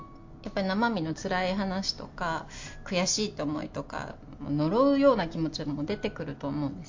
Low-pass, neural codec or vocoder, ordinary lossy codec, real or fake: 7.2 kHz; none; MP3, 48 kbps; real